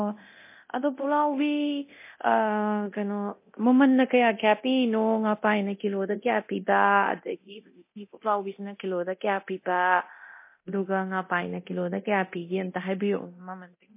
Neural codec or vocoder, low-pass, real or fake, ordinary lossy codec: codec, 24 kHz, 0.9 kbps, DualCodec; 3.6 kHz; fake; MP3, 24 kbps